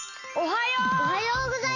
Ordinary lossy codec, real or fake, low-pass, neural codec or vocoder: AAC, 32 kbps; real; 7.2 kHz; none